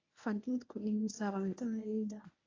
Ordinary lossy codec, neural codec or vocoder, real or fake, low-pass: AAC, 32 kbps; codec, 24 kHz, 0.9 kbps, WavTokenizer, medium speech release version 1; fake; 7.2 kHz